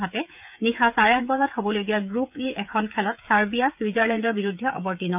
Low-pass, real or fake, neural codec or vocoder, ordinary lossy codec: 3.6 kHz; fake; codec, 16 kHz, 16 kbps, FreqCodec, smaller model; none